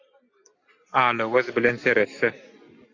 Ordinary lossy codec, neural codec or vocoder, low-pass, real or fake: AAC, 48 kbps; none; 7.2 kHz; real